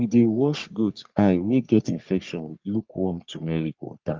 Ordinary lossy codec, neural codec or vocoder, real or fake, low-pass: Opus, 24 kbps; codec, 44.1 kHz, 3.4 kbps, Pupu-Codec; fake; 7.2 kHz